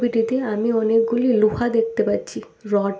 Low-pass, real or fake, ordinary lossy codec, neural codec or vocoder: none; real; none; none